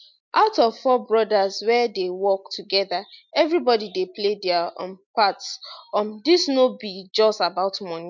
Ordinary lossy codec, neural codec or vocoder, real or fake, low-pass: MP3, 64 kbps; none; real; 7.2 kHz